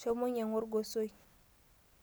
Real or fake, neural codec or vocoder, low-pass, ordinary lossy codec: real; none; none; none